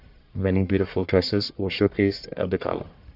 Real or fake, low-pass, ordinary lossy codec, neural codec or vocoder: fake; 5.4 kHz; none; codec, 44.1 kHz, 1.7 kbps, Pupu-Codec